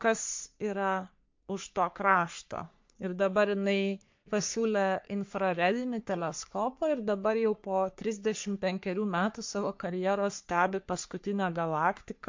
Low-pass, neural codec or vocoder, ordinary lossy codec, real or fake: 7.2 kHz; codec, 44.1 kHz, 3.4 kbps, Pupu-Codec; MP3, 48 kbps; fake